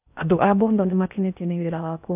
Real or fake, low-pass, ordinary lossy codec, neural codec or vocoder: fake; 3.6 kHz; AAC, 32 kbps; codec, 16 kHz in and 24 kHz out, 0.6 kbps, FocalCodec, streaming, 4096 codes